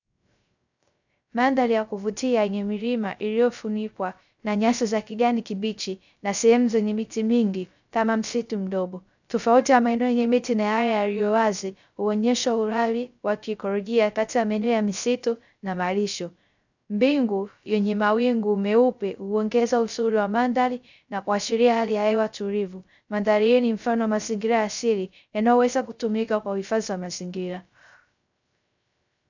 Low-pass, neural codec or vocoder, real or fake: 7.2 kHz; codec, 16 kHz, 0.3 kbps, FocalCodec; fake